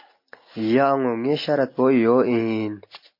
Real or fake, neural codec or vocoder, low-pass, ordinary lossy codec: real; none; 5.4 kHz; MP3, 32 kbps